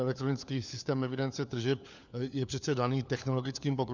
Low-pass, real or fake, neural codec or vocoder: 7.2 kHz; fake; codec, 16 kHz, 4 kbps, FunCodec, trained on LibriTTS, 50 frames a second